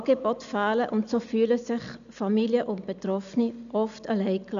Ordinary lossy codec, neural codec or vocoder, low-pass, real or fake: none; none; 7.2 kHz; real